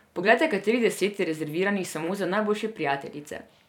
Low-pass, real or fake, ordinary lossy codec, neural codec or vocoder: 19.8 kHz; fake; none; vocoder, 44.1 kHz, 128 mel bands every 256 samples, BigVGAN v2